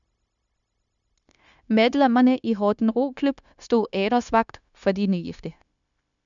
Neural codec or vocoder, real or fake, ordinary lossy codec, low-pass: codec, 16 kHz, 0.9 kbps, LongCat-Audio-Codec; fake; none; 7.2 kHz